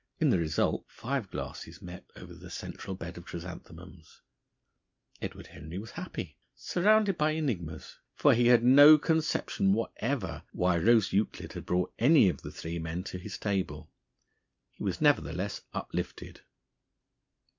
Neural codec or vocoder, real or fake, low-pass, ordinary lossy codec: none; real; 7.2 kHz; MP3, 64 kbps